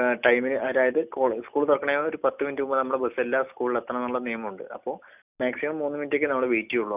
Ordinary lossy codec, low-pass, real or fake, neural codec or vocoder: none; 3.6 kHz; real; none